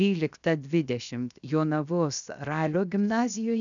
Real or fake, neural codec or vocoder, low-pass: fake; codec, 16 kHz, 0.7 kbps, FocalCodec; 7.2 kHz